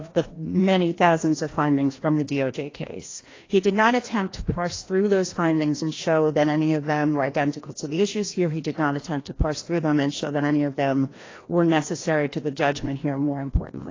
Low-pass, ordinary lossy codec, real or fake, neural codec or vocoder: 7.2 kHz; AAC, 32 kbps; fake; codec, 16 kHz, 1 kbps, FreqCodec, larger model